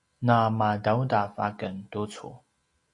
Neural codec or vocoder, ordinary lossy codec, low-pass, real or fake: none; AAC, 48 kbps; 10.8 kHz; real